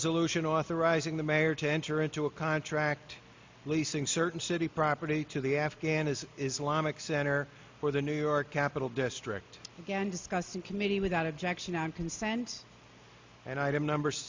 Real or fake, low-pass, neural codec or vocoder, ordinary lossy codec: real; 7.2 kHz; none; MP3, 48 kbps